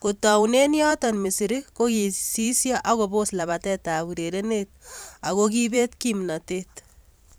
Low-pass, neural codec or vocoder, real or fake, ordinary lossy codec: none; vocoder, 44.1 kHz, 128 mel bands every 512 samples, BigVGAN v2; fake; none